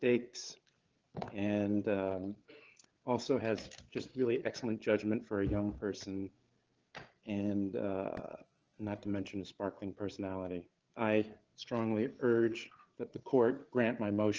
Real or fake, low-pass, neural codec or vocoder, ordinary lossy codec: fake; 7.2 kHz; codec, 16 kHz, 8 kbps, FreqCodec, larger model; Opus, 16 kbps